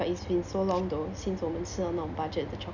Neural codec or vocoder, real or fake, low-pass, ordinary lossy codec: none; real; 7.2 kHz; none